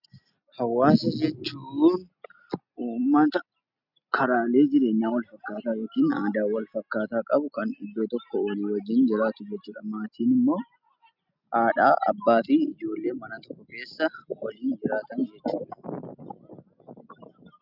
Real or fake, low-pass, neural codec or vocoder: real; 5.4 kHz; none